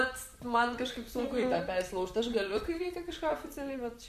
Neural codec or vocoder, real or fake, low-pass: vocoder, 44.1 kHz, 128 mel bands, Pupu-Vocoder; fake; 14.4 kHz